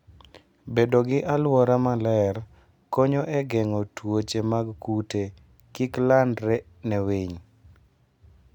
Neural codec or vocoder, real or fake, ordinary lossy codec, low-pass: none; real; none; 19.8 kHz